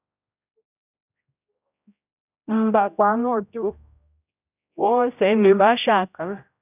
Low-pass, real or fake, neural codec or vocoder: 3.6 kHz; fake; codec, 16 kHz, 0.5 kbps, X-Codec, HuBERT features, trained on general audio